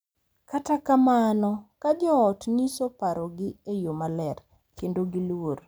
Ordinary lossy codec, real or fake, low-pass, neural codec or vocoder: none; real; none; none